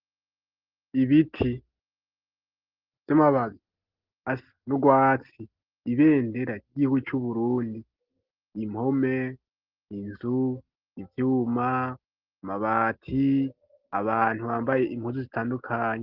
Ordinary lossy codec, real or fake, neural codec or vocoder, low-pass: Opus, 16 kbps; real; none; 5.4 kHz